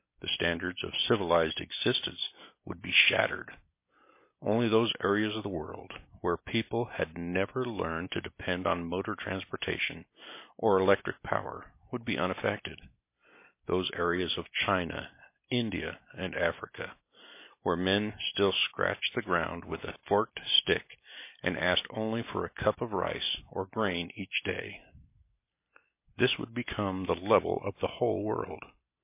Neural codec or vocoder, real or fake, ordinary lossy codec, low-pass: none; real; MP3, 24 kbps; 3.6 kHz